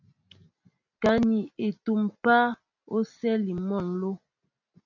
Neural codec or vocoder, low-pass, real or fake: none; 7.2 kHz; real